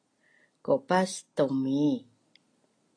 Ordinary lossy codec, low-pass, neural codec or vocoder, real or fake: AAC, 32 kbps; 9.9 kHz; none; real